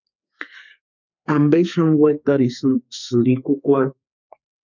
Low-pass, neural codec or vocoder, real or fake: 7.2 kHz; codec, 32 kHz, 1.9 kbps, SNAC; fake